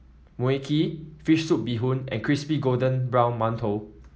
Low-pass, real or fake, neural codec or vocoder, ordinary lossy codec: none; real; none; none